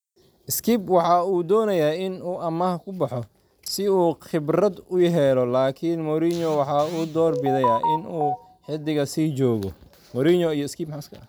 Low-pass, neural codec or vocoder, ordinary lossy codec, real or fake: none; none; none; real